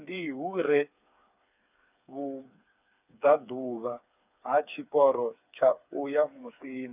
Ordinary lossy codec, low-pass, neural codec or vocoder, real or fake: none; 3.6 kHz; codec, 16 kHz, 4 kbps, FreqCodec, smaller model; fake